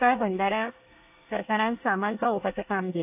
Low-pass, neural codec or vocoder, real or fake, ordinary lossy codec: 3.6 kHz; codec, 24 kHz, 1 kbps, SNAC; fake; AAC, 32 kbps